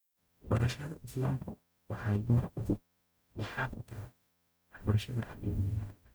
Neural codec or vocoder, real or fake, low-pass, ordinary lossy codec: codec, 44.1 kHz, 0.9 kbps, DAC; fake; none; none